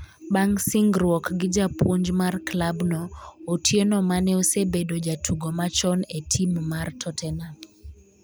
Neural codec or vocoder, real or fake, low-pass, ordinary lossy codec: none; real; none; none